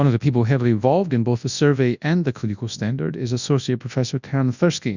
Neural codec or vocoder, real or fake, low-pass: codec, 24 kHz, 0.9 kbps, WavTokenizer, large speech release; fake; 7.2 kHz